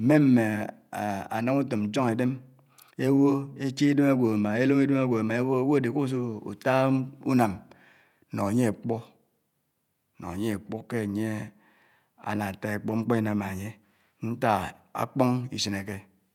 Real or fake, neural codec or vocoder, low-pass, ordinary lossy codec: fake; vocoder, 48 kHz, 128 mel bands, Vocos; 19.8 kHz; none